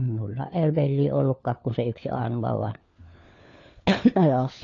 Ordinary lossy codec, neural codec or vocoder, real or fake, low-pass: AAC, 32 kbps; codec, 16 kHz, 4 kbps, FunCodec, trained on LibriTTS, 50 frames a second; fake; 7.2 kHz